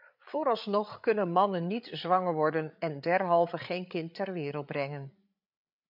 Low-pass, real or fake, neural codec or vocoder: 5.4 kHz; fake; codec, 16 kHz, 8 kbps, FreqCodec, larger model